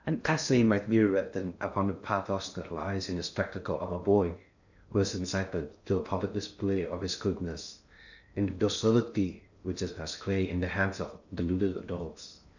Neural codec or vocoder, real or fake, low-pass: codec, 16 kHz in and 24 kHz out, 0.6 kbps, FocalCodec, streaming, 2048 codes; fake; 7.2 kHz